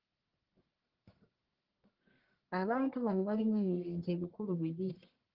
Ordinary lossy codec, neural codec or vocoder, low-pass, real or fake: Opus, 16 kbps; codec, 44.1 kHz, 1.7 kbps, Pupu-Codec; 5.4 kHz; fake